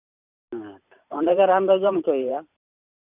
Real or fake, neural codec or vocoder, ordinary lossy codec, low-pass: fake; vocoder, 44.1 kHz, 128 mel bands every 512 samples, BigVGAN v2; none; 3.6 kHz